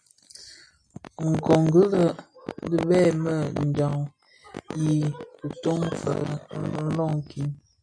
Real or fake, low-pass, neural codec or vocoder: real; 9.9 kHz; none